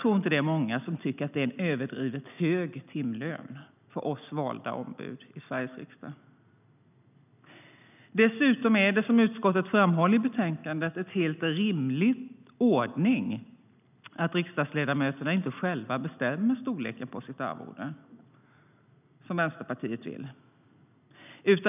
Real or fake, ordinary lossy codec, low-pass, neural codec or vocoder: real; none; 3.6 kHz; none